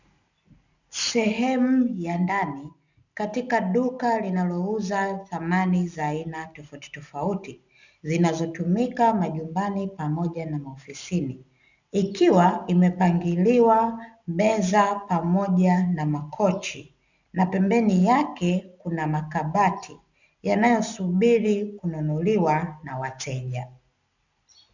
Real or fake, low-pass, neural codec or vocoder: real; 7.2 kHz; none